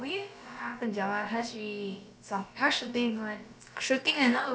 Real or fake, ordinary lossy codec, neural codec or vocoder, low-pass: fake; none; codec, 16 kHz, about 1 kbps, DyCAST, with the encoder's durations; none